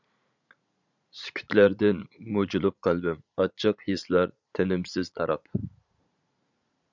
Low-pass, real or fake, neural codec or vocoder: 7.2 kHz; fake; vocoder, 44.1 kHz, 80 mel bands, Vocos